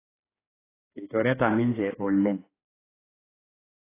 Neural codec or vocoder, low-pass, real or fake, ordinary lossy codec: codec, 16 kHz, 4 kbps, X-Codec, HuBERT features, trained on general audio; 3.6 kHz; fake; AAC, 16 kbps